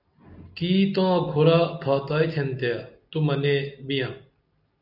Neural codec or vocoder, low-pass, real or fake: none; 5.4 kHz; real